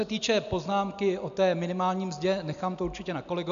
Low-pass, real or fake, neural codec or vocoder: 7.2 kHz; real; none